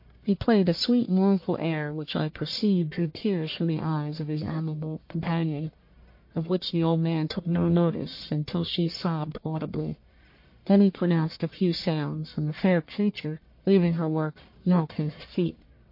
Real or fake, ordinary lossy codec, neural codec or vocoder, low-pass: fake; MP3, 32 kbps; codec, 44.1 kHz, 1.7 kbps, Pupu-Codec; 5.4 kHz